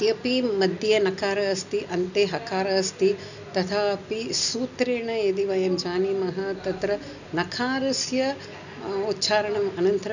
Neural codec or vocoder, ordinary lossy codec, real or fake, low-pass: none; none; real; 7.2 kHz